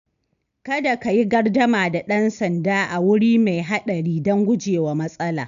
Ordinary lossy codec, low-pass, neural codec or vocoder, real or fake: none; 7.2 kHz; none; real